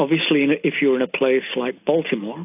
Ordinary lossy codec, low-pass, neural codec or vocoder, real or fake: AAC, 32 kbps; 3.6 kHz; none; real